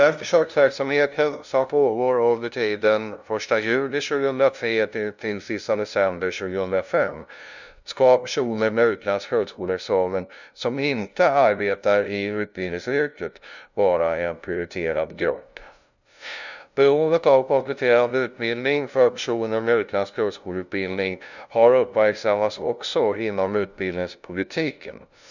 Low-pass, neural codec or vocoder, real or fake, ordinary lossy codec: 7.2 kHz; codec, 16 kHz, 0.5 kbps, FunCodec, trained on LibriTTS, 25 frames a second; fake; none